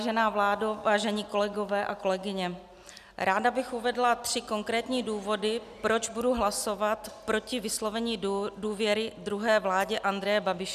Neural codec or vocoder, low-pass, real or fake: none; 14.4 kHz; real